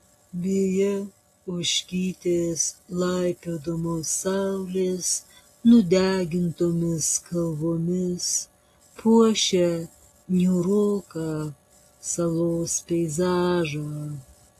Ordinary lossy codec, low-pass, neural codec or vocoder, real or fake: AAC, 48 kbps; 14.4 kHz; none; real